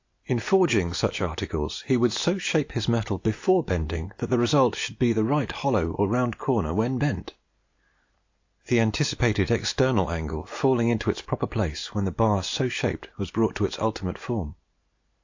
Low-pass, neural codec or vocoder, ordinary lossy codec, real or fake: 7.2 kHz; none; AAC, 48 kbps; real